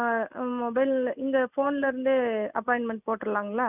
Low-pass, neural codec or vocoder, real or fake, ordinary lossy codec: 3.6 kHz; none; real; none